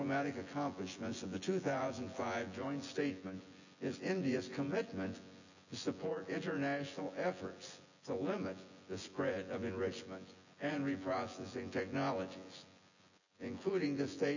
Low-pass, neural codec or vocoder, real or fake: 7.2 kHz; vocoder, 24 kHz, 100 mel bands, Vocos; fake